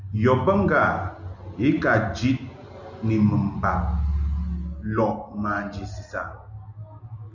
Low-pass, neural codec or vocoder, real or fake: 7.2 kHz; none; real